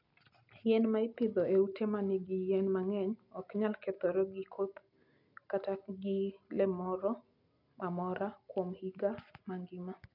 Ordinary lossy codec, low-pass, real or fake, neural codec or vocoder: none; 5.4 kHz; real; none